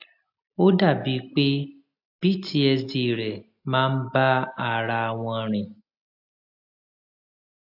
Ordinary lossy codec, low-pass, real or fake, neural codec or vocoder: AAC, 48 kbps; 5.4 kHz; real; none